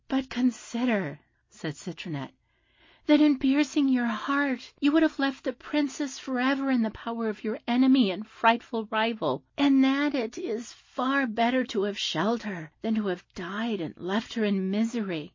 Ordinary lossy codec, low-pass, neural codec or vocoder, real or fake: MP3, 32 kbps; 7.2 kHz; none; real